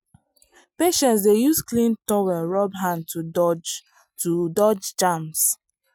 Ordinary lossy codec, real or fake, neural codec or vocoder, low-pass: none; real; none; none